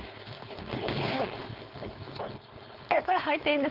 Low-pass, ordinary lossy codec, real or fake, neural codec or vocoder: 5.4 kHz; Opus, 24 kbps; fake; codec, 16 kHz, 4.8 kbps, FACodec